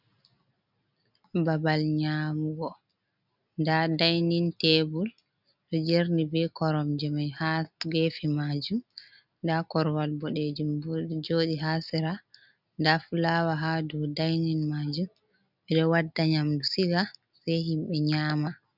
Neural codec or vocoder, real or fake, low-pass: none; real; 5.4 kHz